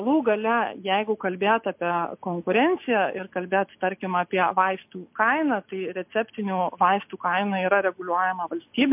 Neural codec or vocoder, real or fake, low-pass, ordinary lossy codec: none; real; 3.6 kHz; MP3, 32 kbps